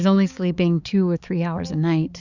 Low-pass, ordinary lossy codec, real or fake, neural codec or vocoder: 7.2 kHz; Opus, 64 kbps; fake; codec, 16 kHz, 4 kbps, X-Codec, HuBERT features, trained on balanced general audio